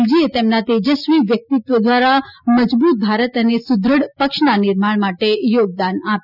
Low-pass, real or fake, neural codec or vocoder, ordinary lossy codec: 5.4 kHz; real; none; none